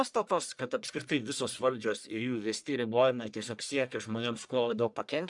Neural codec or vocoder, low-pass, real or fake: codec, 44.1 kHz, 1.7 kbps, Pupu-Codec; 10.8 kHz; fake